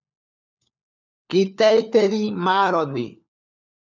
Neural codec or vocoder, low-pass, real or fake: codec, 16 kHz, 4 kbps, FunCodec, trained on LibriTTS, 50 frames a second; 7.2 kHz; fake